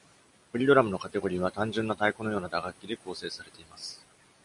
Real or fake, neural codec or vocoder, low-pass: real; none; 10.8 kHz